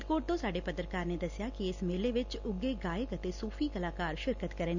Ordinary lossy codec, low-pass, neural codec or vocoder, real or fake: none; 7.2 kHz; none; real